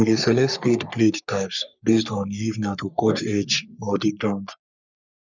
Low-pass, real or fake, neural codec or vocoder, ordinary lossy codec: 7.2 kHz; fake; codec, 44.1 kHz, 3.4 kbps, Pupu-Codec; none